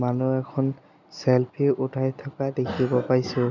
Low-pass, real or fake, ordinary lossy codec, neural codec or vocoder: 7.2 kHz; real; none; none